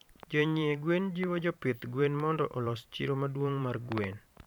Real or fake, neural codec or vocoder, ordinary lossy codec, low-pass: fake; vocoder, 44.1 kHz, 128 mel bands every 512 samples, BigVGAN v2; none; 19.8 kHz